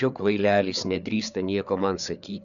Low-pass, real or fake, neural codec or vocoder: 7.2 kHz; fake; codec, 16 kHz, 4 kbps, FreqCodec, larger model